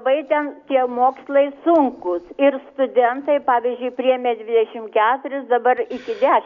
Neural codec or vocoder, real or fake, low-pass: none; real; 7.2 kHz